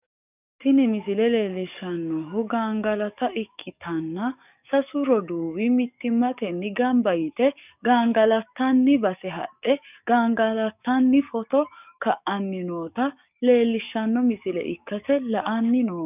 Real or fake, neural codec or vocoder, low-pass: fake; codec, 44.1 kHz, 7.8 kbps, DAC; 3.6 kHz